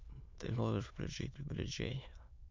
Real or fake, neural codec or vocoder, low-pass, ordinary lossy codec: fake; autoencoder, 22.05 kHz, a latent of 192 numbers a frame, VITS, trained on many speakers; 7.2 kHz; MP3, 64 kbps